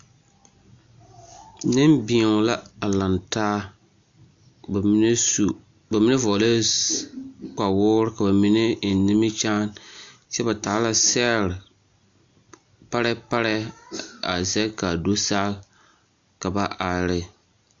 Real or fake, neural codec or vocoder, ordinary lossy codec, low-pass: real; none; AAC, 64 kbps; 7.2 kHz